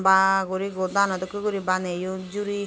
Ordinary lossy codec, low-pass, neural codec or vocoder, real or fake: none; none; none; real